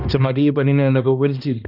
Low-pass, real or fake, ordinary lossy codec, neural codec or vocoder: 5.4 kHz; fake; none; codec, 16 kHz, 1 kbps, X-Codec, HuBERT features, trained on balanced general audio